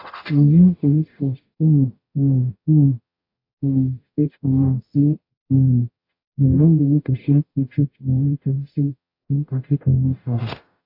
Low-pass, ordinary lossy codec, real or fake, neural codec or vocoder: 5.4 kHz; AAC, 32 kbps; fake; codec, 44.1 kHz, 0.9 kbps, DAC